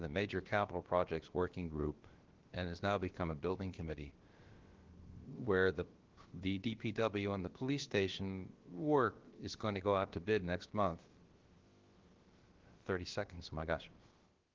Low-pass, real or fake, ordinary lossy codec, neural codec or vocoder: 7.2 kHz; fake; Opus, 16 kbps; codec, 16 kHz, about 1 kbps, DyCAST, with the encoder's durations